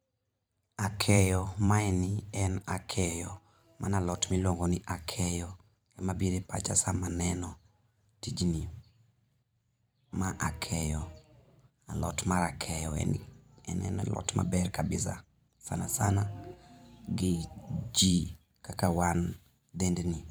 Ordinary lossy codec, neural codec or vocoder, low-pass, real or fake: none; vocoder, 44.1 kHz, 128 mel bands every 512 samples, BigVGAN v2; none; fake